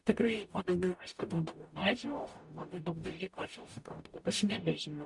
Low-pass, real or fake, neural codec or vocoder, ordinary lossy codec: 10.8 kHz; fake; codec, 44.1 kHz, 0.9 kbps, DAC; MP3, 64 kbps